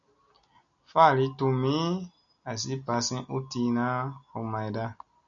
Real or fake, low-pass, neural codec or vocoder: real; 7.2 kHz; none